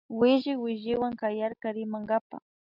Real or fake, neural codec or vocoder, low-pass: real; none; 5.4 kHz